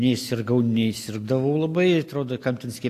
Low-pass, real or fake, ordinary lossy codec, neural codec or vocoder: 14.4 kHz; real; AAC, 64 kbps; none